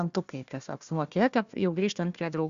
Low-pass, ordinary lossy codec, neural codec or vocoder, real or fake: 7.2 kHz; Opus, 64 kbps; codec, 16 kHz, 1 kbps, FunCodec, trained on Chinese and English, 50 frames a second; fake